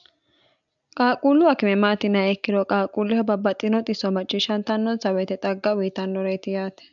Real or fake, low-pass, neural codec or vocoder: real; 7.2 kHz; none